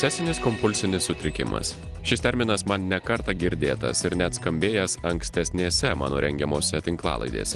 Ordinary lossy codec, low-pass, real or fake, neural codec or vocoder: Opus, 24 kbps; 10.8 kHz; real; none